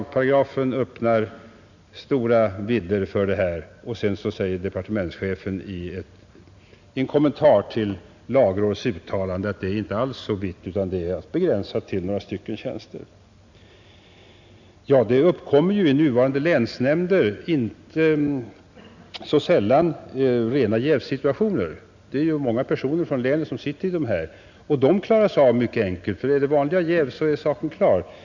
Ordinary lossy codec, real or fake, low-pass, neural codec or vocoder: none; real; 7.2 kHz; none